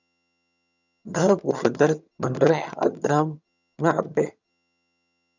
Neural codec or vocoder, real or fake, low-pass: vocoder, 22.05 kHz, 80 mel bands, HiFi-GAN; fake; 7.2 kHz